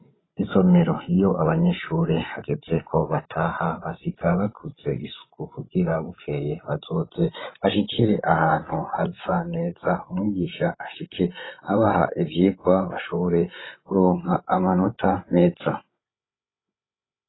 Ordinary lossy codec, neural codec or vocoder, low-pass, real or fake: AAC, 16 kbps; codec, 16 kHz, 16 kbps, FreqCodec, larger model; 7.2 kHz; fake